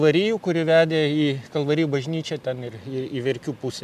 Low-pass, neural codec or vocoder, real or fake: 14.4 kHz; none; real